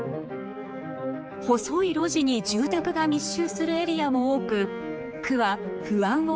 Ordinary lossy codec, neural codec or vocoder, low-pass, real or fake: none; codec, 16 kHz, 4 kbps, X-Codec, HuBERT features, trained on general audio; none; fake